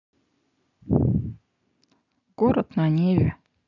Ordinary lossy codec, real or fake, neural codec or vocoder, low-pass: none; real; none; 7.2 kHz